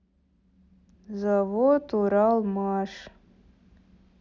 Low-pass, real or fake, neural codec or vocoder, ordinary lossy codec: 7.2 kHz; real; none; none